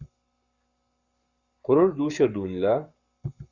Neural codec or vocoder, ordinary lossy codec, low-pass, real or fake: codec, 44.1 kHz, 7.8 kbps, Pupu-Codec; Opus, 64 kbps; 7.2 kHz; fake